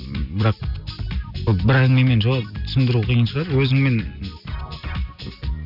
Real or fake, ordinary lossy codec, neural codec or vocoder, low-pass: real; none; none; 5.4 kHz